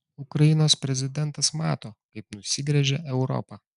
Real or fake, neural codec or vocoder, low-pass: real; none; 10.8 kHz